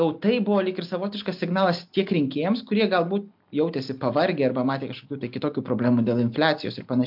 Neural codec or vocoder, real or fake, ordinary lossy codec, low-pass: none; real; MP3, 48 kbps; 5.4 kHz